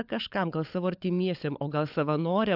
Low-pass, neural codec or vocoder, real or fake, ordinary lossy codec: 5.4 kHz; codec, 16 kHz, 16 kbps, FunCodec, trained on LibriTTS, 50 frames a second; fake; AAC, 48 kbps